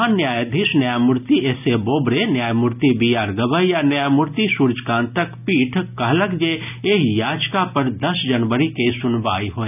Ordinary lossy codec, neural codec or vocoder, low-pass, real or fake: none; none; 3.6 kHz; real